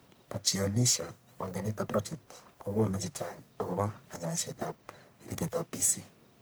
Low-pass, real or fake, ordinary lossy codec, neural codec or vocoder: none; fake; none; codec, 44.1 kHz, 1.7 kbps, Pupu-Codec